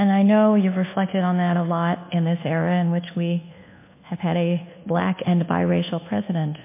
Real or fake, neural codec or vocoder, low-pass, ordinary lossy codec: fake; codec, 24 kHz, 1.2 kbps, DualCodec; 3.6 kHz; MP3, 24 kbps